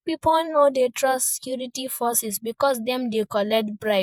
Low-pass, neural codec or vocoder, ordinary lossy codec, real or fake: none; vocoder, 48 kHz, 128 mel bands, Vocos; none; fake